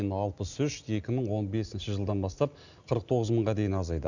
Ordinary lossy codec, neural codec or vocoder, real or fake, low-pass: none; none; real; 7.2 kHz